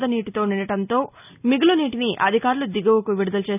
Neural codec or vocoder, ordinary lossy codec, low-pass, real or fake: none; none; 3.6 kHz; real